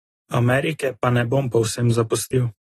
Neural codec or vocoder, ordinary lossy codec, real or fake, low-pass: none; AAC, 32 kbps; real; 19.8 kHz